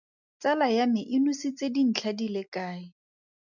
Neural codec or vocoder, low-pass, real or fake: none; 7.2 kHz; real